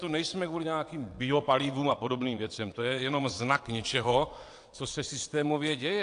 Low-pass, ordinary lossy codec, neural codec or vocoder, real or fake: 9.9 kHz; AAC, 64 kbps; vocoder, 22.05 kHz, 80 mel bands, WaveNeXt; fake